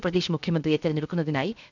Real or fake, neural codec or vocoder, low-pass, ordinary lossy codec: fake; codec, 16 kHz, 0.7 kbps, FocalCodec; 7.2 kHz; none